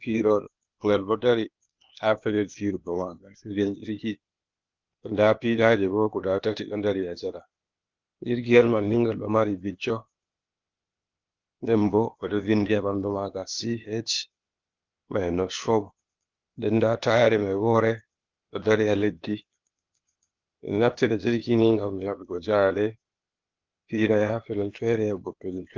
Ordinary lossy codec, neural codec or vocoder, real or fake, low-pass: Opus, 24 kbps; codec, 16 kHz, 0.8 kbps, ZipCodec; fake; 7.2 kHz